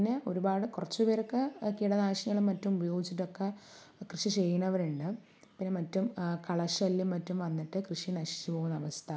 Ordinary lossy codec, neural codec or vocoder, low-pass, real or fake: none; none; none; real